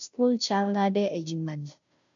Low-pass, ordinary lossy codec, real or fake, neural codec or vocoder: 7.2 kHz; MP3, 64 kbps; fake; codec, 16 kHz, 0.5 kbps, FunCodec, trained on Chinese and English, 25 frames a second